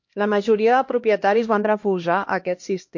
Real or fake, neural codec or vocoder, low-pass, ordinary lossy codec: fake; codec, 16 kHz, 1 kbps, X-Codec, HuBERT features, trained on LibriSpeech; 7.2 kHz; MP3, 48 kbps